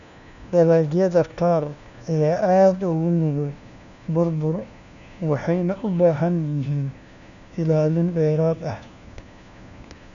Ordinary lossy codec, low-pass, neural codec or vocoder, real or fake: none; 7.2 kHz; codec, 16 kHz, 1 kbps, FunCodec, trained on LibriTTS, 50 frames a second; fake